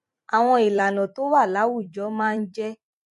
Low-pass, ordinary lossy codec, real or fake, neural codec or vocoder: 14.4 kHz; MP3, 48 kbps; fake; vocoder, 44.1 kHz, 128 mel bands every 256 samples, BigVGAN v2